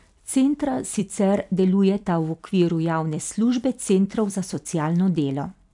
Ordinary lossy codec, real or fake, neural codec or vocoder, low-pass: none; fake; vocoder, 44.1 kHz, 128 mel bands every 256 samples, BigVGAN v2; 10.8 kHz